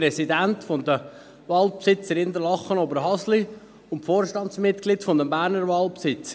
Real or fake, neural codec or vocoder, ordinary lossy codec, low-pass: real; none; none; none